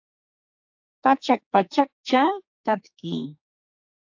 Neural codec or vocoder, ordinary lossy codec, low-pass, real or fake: codec, 44.1 kHz, 2.6 kbps, SNAC; AAC, 48 kbps; 7.2 kHz; fake